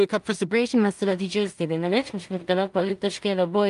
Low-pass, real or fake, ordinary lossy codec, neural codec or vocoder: 10.8 kHz; fake; Opus, 32 kbps; codec, 16 kHz in and 24 kHz out, 0.4 kbps, LongCat-Audio-Codec, two codebook decoder